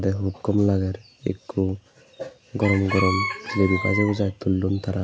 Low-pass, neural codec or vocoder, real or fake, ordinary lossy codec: none; none; real; none